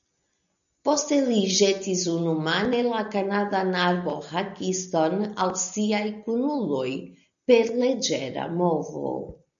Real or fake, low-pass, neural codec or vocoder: real; 7.2 kHz; none